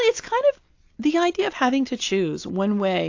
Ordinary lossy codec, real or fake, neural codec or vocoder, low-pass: AAC, 48 kbps; real; none; 7.2 kHz